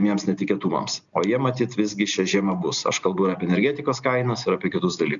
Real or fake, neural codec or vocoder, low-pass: real; none; 7.2 kHz